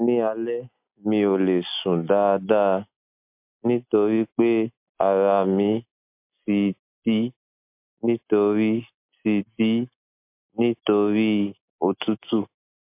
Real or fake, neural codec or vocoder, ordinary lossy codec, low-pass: real; none; MP3, 32 kbps; 3.6 kHz